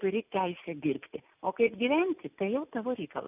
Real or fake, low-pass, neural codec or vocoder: real; 3.6 kHz; none